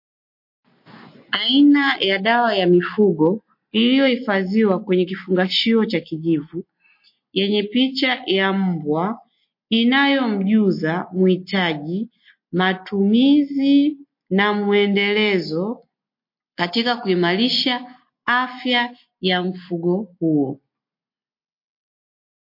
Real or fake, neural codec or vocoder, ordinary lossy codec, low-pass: real; none; MP3, 32 kbps; 5.4 kHz